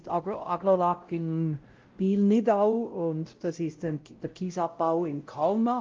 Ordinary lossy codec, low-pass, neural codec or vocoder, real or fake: Opus, 24 kbps; 7.2 kHz; codec, 16 kHz, 0.5 kbps, X-Codec, WavLM features, trained on Multilingual LibriSpeech; fake